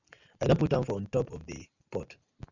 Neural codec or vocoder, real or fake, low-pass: none; real; 7.2 kHz